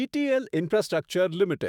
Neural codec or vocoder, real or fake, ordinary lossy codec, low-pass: autoencoder, 48 kHz, 128 numbers a frame, DAC-VAE, trained on Japanese speech; fake; none; 19.8 kHz